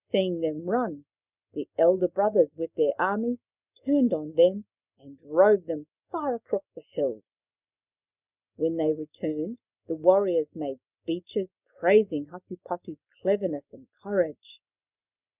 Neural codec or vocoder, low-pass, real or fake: none; 3.6 kHz; real